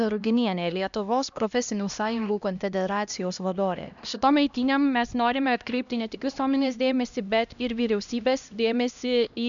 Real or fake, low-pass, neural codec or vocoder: fake; 7.2 kHz; codec, 16 kHz, 1 kbps, X-Codec, HuBERT features, trained on LibriSpeech